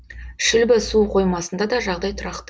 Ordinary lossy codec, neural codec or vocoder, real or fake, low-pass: none; none; real; none